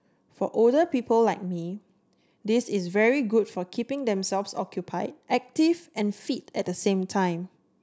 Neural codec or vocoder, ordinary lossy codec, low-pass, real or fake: none; none; none; real